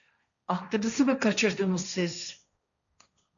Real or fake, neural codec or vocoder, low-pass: fake; codec, 16 kHz, 1.1 kbps, Voila-Tokenizer; 7.2 kHz